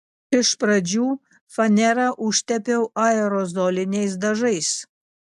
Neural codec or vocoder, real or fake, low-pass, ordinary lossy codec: none; real; 14.4 kHz; AAC, 96 kbps